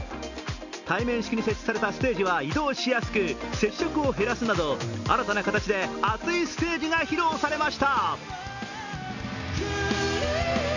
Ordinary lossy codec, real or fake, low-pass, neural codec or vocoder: none; real; 7.2 kHz; none